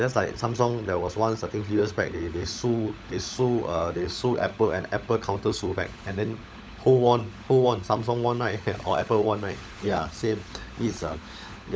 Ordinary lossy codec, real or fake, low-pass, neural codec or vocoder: none; fake; none; codec, 16 kHz, 16 kbps, FunCodec, trained on LibriTTS, 50 frames a second